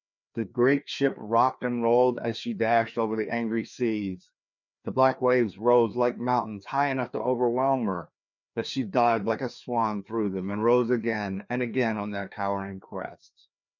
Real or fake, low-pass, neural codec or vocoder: fake; 7.2 kHz; codec, 16 kHz, 2 kbps, FreqCodec, larger model